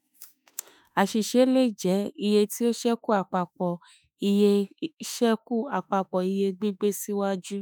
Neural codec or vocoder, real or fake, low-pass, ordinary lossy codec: autoencoder, 48 kHz, 32 numbers a frame, DAC-VAE, trained on Japanese speech; fake; none; none